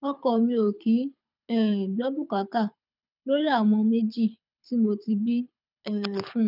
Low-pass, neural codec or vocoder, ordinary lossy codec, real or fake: 5.4 kHz; codec, 24 kHz, 6 kbps, HILCodec; none; fake